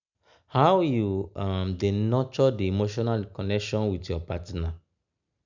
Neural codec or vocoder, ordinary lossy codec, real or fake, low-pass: none; none; real; 7.2 kHz